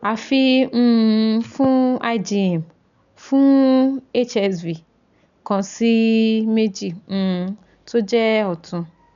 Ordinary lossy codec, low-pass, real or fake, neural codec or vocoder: none; 7.2 kHz; real; none